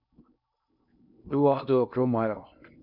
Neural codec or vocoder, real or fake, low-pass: codec, 16 kHz in and 24 kHz out, 0.6 kbps, FocalCodec, streaming, 2048 codes; fake; 5.4 kHz